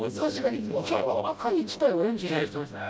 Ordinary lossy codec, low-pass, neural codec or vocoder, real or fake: none; none; codec, 16 kHz, 0.5 kbps, FreqCodec, smaller model; fake